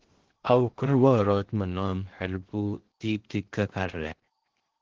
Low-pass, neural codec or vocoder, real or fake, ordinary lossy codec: 7.2 kHz; codec, 16 kHz in and 24 kHz out, 0.6 kbps, FocalCodec, streaming, 2048 codes; fake; Opus, 16 kbps